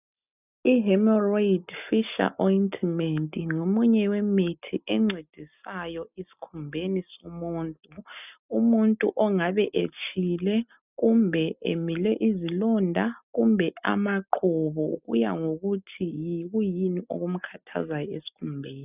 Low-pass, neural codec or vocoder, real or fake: 3.6 kHz; none; real